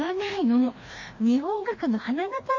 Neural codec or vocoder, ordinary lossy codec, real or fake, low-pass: codec, 16 kHz, 1 kbps, FreqCodec, larger model; MP3, 32 kbps; fake; 7.2 kHz